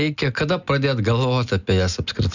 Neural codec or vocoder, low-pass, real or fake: none; 7.2 kHz; real